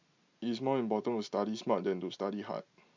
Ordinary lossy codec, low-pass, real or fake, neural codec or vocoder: MP3, 64 kbps; 7.2 kHz; real; none